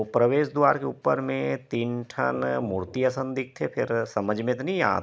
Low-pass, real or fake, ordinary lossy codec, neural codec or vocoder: none; real; none; none